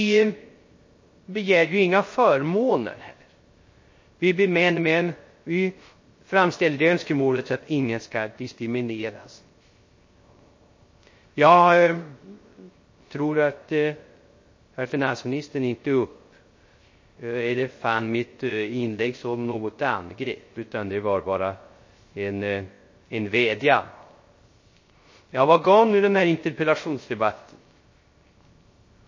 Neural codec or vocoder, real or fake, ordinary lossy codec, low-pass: codec, 16 kHz, 0.3 kbps, FocalCodec; fake; MP3, 32 kbps; 7.2 kHz